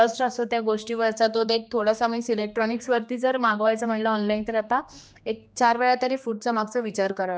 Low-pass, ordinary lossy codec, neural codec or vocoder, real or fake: none; none; codec, 16 kHz, 2 kbps, X-Codec, HuBERT features, trained on general audio; fake